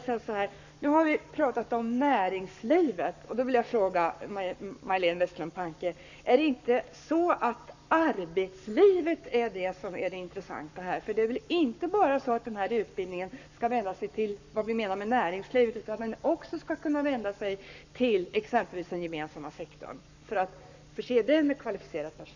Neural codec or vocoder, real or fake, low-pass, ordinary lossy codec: codec, 44.1 kHz, 7.8 kbps, Pupu-Codec; fake; 7.2 kHz; none